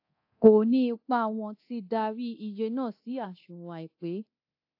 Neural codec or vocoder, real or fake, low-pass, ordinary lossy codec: codec, 24 kHz, 0.9 kbps, DualCodec; fake; 5.4 kHz; AAC, 32 kbps